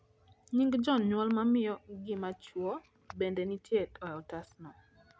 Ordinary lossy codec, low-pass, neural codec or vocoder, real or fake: none; none; none; real